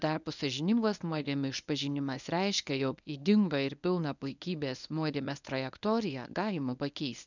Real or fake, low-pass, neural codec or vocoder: fake; 7.2 kHz; codec, 24 kHz, 0.9 kbps, WavTokenizer, medium speech release version 1